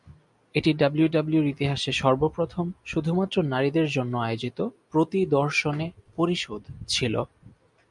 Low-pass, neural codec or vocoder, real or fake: 10.8 kHz; none; real